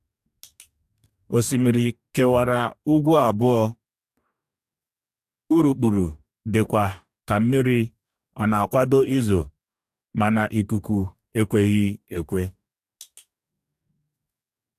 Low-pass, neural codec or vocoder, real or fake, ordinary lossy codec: 14.4 kHz; codec, 44.1 kHz, 2.6 kbps, DAC; fake; AAC, 96 kbps